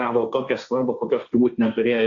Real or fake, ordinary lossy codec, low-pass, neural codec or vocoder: fake; Opus, 64 kbps; 7.2 kHz; codec, 16 kHz, 1.1 kbps, Voila-Tokenizer